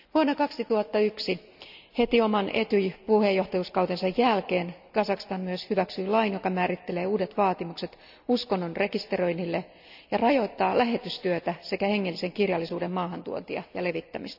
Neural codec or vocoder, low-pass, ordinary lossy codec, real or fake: none; 5.4 kHz; none; real